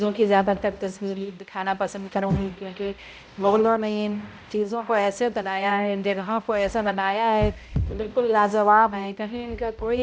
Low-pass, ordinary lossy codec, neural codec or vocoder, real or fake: none; none; codec, 16 kHz, 0.5 kbps, X-Codec, HuBERT features, trained on balanced general audio; fake